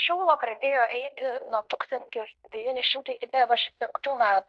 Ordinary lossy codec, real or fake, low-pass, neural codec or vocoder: AAC, 64 kbps; fake; 10.8 kHz; codec, 16 kHz in and 24 kHz out, 0.9 kbps, LongCat-Audio-Codec, fine tuned four codebook decoder